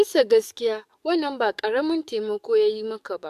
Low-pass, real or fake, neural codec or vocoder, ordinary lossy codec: 14.4 kHz; fake; codec, 44.1 kHz, 7.8 kbps, DAC; AAC, 96 kbps